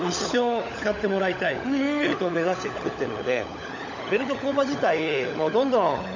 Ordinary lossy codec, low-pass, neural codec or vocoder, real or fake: none; 7.2 kHz; codec, 16 kHz, 16 kbps, FunCodec, trained on LibriTTS, 50 frames a second; fake